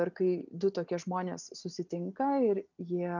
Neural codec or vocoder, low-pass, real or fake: none; 7.2 kHz; real